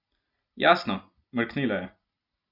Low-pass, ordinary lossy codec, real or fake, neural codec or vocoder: 5.4 kHz; none; real; none